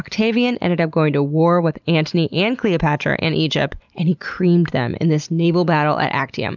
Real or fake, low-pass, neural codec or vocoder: real; 7.2 kHz; none